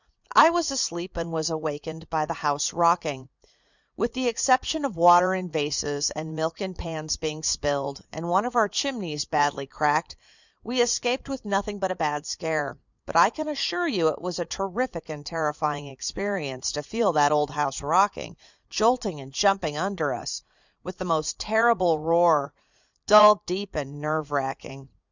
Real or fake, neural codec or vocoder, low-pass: fake; vocoder, 44.1 kHz, 80 mel bands, Vocos; 7.2 kHz